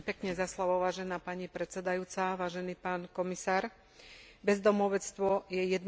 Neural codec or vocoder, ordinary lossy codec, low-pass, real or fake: none; none; none; real